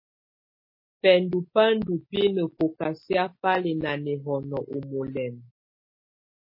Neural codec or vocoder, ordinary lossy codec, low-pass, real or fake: none; MP3, 24 kbps; 5.4 kHz; real